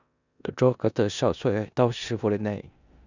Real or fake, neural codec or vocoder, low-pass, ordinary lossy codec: fake; codec, 16 kHz in and 24 kHz out, 0.9 kbps, LongCat-Audio-Codec, four codebook decoder; 7.2 kHz; none